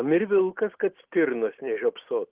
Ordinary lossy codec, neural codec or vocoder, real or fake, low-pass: Opus, 16 kbps; none; real; 3.6 kHz